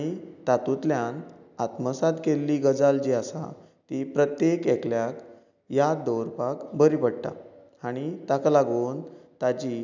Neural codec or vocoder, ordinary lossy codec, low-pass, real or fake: none; none; 7.2 kHz; real